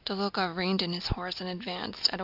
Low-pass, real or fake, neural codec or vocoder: 5.4 kHz; real; none